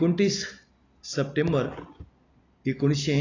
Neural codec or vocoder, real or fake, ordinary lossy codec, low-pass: none; real; AAC, 32 kbps; 7.2 kHz